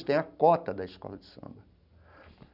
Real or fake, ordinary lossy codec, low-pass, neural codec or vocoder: real; none; 5.4 kHz; none